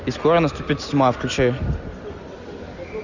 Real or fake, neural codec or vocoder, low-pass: real; none; 7.2 kHz